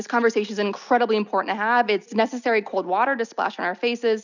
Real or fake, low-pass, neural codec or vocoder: real; 7.2 kHz; none